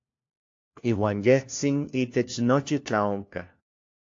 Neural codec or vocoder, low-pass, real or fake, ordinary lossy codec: codec, 16 kHz, 1 kbps, FunCodec, trained on LibriTTS, 50 frames a second; 7.2 kHz; fake; AAC, 48 kbps